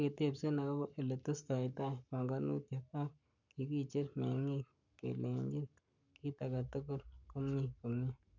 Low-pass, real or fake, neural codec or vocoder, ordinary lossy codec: 7.2 kHz; fake; codec, 44.1 kHz, 7.8 kbps, Pupu-Codec; none